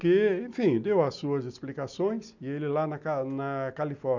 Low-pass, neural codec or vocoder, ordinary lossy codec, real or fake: 7.2 kHz; none; none; real